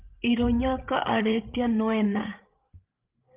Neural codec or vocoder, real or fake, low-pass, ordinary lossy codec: codec, 16 kHz, 16 kbps, FreqCodec, larger model; fake; 3.6 kHz; Opus, 32 kbps